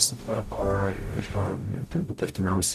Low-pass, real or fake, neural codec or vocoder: 14.4 kHz; fake; codec, 44.1 kHz, 0.9 kbps, DAC